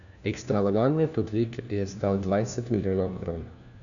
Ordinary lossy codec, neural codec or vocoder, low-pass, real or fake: none; codec, 16 kHz, 1 kbps, FunCodec, trained on LibriTTS, 50 frames a second; 7.2 kHz; fake